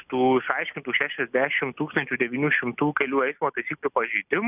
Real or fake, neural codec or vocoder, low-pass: real; none; 3.6 kHz